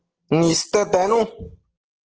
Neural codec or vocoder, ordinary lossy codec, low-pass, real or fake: codec, 44.1 kHz, 7.8 kbps, DAC; Opus, 16 kbps; 7.2 kHz; fake